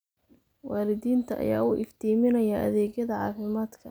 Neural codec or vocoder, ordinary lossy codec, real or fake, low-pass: none; none; real; none